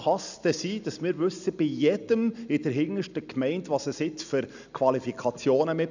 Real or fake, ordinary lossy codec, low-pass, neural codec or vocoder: real; none; 7.2 kHz; none